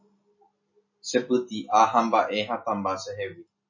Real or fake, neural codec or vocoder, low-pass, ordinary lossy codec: real; none; 7.2 kHz; MP3, 32 kbps